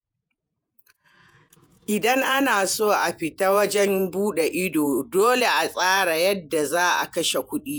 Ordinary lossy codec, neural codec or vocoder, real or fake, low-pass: none; vocoder, 48 kHz, 128 mel bands, Vocos; fake; none